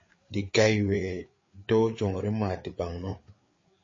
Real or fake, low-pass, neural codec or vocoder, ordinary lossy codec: fake; 7.2 kHz; codec, 16 kHz, 4 kbps, FreqCodec, larger model; MP3, 32 kbps